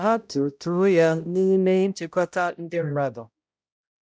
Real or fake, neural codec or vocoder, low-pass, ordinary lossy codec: fake; codec, 16 kHz, 0.5 kbps, X-Codec, HuBERT features, trained on balanced general audio; none; none